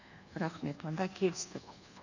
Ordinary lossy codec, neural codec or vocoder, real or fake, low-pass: none; codec, 24 kHz, 1.2 kbps, DualCodec; fake; 7.2 kHz